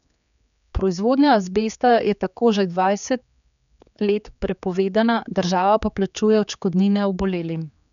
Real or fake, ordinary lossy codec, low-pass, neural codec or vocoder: fake; none; 7.2 kHz; codec, 16 kHz, 4 kbps, X-Codec, HuBERT features, trained on general audio